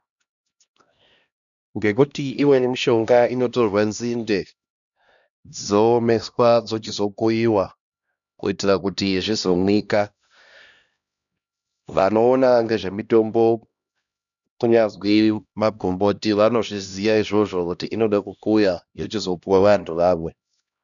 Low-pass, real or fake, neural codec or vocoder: 7.2 kHz; fake; codec, 16 kHz, 1 kbps, X-Codec, HuBERT features, trained on LibriSpeech